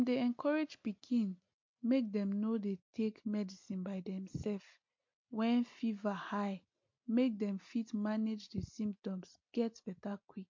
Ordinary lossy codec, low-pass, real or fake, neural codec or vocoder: MP3, 48 kbps; 7.2 kHz; real; none